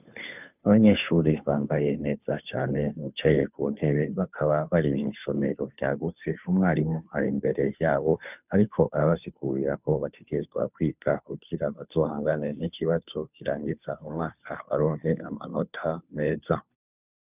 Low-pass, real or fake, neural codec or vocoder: 3.6 kHz; fake; codec, 16 kHz, 2 kbps, FunCodec, trained on Chinese and English, 25 frames a second